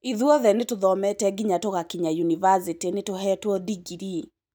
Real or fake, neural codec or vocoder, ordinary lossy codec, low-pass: real; none; none; none